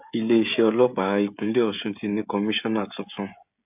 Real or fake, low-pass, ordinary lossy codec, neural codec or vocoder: fake; 3.6 kHz; AAC, 32 kbps; codec, 16 kHz, 16 kbps, FreqCodec, smaller model